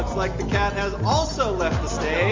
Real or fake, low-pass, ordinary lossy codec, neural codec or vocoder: real; 7.2 kHz; MP3, 48 kbps; none